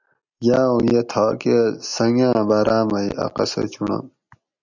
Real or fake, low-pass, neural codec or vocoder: real; 7.2 kHz; none